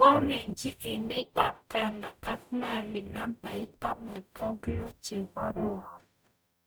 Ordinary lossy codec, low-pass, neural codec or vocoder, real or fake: none; none; codec, 44.1 kHz, 0.9 kbps, DAC; fake